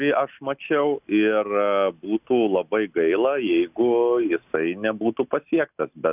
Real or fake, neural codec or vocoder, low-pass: real; none; 3.6 kHz